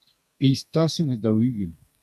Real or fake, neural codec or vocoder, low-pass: fake; codec, 32 kHz, 1.9 kbps, SNAC; 14.4 kHz